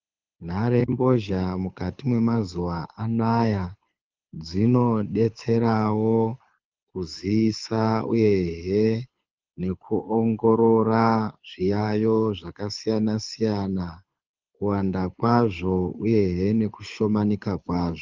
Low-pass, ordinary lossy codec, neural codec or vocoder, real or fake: 7.2 kHz; Opus, 24 kbps; codec, 24 kHz, 6 kbps, HILCodec; fake